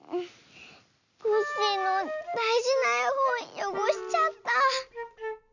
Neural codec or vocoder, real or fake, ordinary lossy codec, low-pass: none; real; none; 7.2 kHz